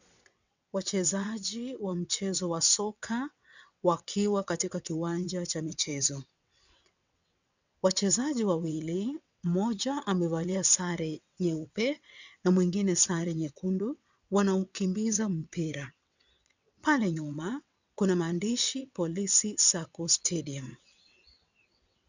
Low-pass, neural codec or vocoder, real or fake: 7.2 kHz; vocoder, 22.05 kHz, 80 mel bands, WaveNeXt; fake